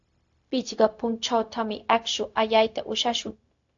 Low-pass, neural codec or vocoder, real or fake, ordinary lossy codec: 7.2 kHz; codec, 16 kHz, 0.4 kbps, LongCat-Audio-Codec; fake; AAC, 48 kbps